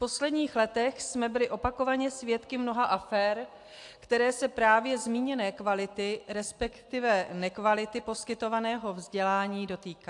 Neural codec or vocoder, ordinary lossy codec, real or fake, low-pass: none; AAC, 64 kbps; real; 10.8 kHz